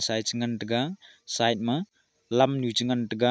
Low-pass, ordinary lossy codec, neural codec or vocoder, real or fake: none; none; none; real